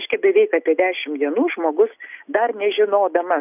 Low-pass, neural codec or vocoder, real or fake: 3.6 kHz; none; real